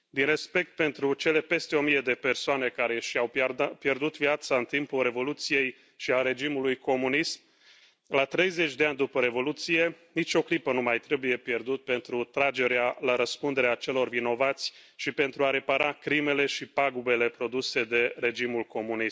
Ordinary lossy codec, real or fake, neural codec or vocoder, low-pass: none; real; none; none